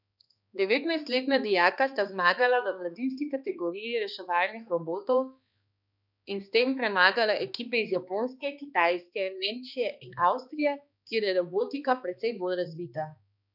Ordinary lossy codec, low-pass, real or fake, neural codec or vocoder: none; 5.4 kHz; fake; codec, 16 kHz, 2 kbps, X-Codec, HuBERT features, trained on balanced general audio